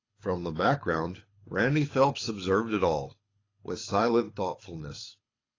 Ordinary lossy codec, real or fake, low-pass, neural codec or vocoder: AAC, 32 kbps; fake; 7.2 kHz; codec, 24 kHz, 6 kbps, HILCodec